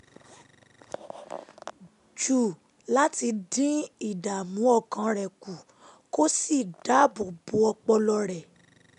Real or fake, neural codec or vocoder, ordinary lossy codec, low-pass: real; none; none; 10.8 kHz